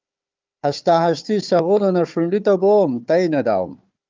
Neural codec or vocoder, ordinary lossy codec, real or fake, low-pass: codec, 16 kHz, 4 kbps, FunCodec, trained on Chinese and English, 50 frames a second; Opus, 24 kbps; fake; 7.2 kHz